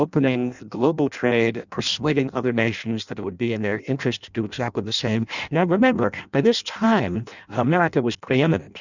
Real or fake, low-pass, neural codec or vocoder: fake; 7.2 kHz; codec, 16 kHz in and 24 kHz out, 0.6 kbps, FireRedTTS-2 codec